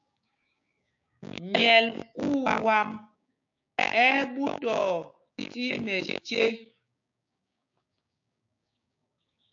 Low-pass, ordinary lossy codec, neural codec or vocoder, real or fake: 7.2 kHz; AAC, 64 kbps; codec, 16 kHz, 6 kbps, DAC; fake